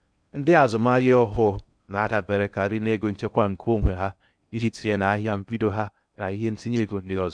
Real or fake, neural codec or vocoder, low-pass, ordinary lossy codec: fake; codec, 16 kHz in and 24 kHz out, 0.6 kbps, FocalCodec, streaming, 2048 codes; 9.9 kHz; none